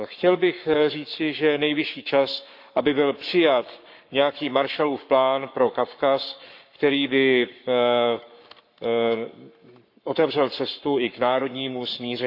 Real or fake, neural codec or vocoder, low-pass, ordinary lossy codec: fake; codec, 44.1 kHz, 7.8 kbps, Pupu-Codec; 5.4 kHz; MP3, 48 kbps